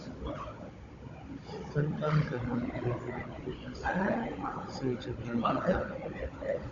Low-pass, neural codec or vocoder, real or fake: 7.2 kHz; codec, 16 kHz, 16 kbps, FunCodec, trained on Chinese and English, 50 frames a second; fake